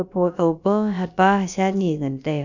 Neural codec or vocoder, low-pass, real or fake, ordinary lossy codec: codec, 16 kHz, about 1 kbps, DyCAST, with the encoder's durations; 7.2 kHz; fake; none